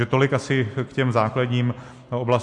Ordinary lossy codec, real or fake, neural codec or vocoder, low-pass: MP3, 64 kbps; real; none; 10.8 kHz